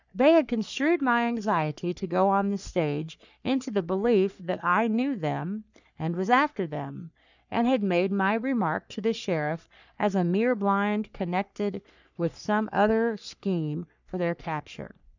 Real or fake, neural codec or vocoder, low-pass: fake; codec, 44.1 kHz, 3.4 kbps, Pupu-Codec; 7.2 kHz